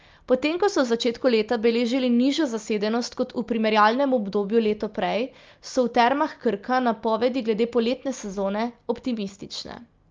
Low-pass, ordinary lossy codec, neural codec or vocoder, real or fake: 7.2 kHz; Opus, 24 kbps; none; real